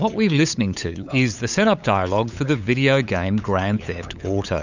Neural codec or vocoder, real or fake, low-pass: codec, 16 kHz, 8 kbps, FunCodec, trained on LibriTTS, 25 frames a second; fake; 7.2 kHz